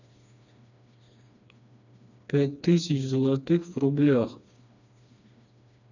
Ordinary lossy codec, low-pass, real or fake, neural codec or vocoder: none; 7.2 kHz; fake; codec, 16 kHz, 2 kbps, FreqCodec, smaller model